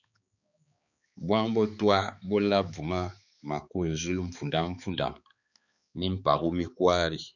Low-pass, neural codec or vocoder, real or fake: 7.2 kHz; codec, 16 kHz, 4 kbps, X-Codec, HuBERT features, trained on balanced general audio; fake